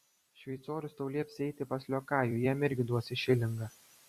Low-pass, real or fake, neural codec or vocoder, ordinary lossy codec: 14.4 kHz; fake; vocoder, 44.1 kHz, 128 mel bands every 256 samples, BigVGAN v2; Opus, 64 kbps